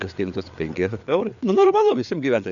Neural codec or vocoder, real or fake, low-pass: codec, 16 kHz, 4 kbps, FreqCodec, larger model; fake; 7.2 kHz